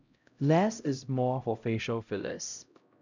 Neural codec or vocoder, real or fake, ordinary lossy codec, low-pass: codec, 16 kHz, 0.5 kbps, X-Codec, HuBERT features, trained on LibriSpeech; fake; none; 7.2 kHz